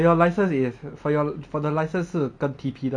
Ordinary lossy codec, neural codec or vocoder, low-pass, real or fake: none; none; 9.9 kHz; real